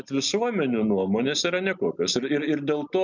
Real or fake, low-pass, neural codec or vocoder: real; 7.2 kHz; none